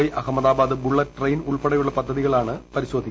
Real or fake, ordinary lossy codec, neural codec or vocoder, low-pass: real; none; none; none